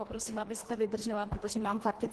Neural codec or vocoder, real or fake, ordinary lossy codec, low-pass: codec, 24 kHz, 1.5 kbps, HILCodec; fake; Opus, 16 kbps; 10.8 kHz